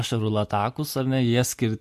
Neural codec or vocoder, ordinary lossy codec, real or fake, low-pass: none; MP3, 64 kbps; real; 14.4 kHz